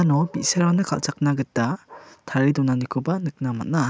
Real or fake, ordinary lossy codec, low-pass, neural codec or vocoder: real; none; none; none